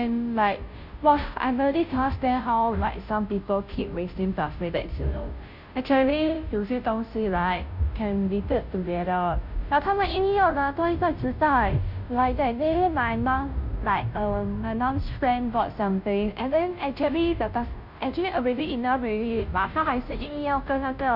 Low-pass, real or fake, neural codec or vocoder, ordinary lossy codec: 5.4 kHz; fake; codec, 16 kHz, 0.5 kbps, FunCodec, trained on Chinese and English, 25 frames a second; none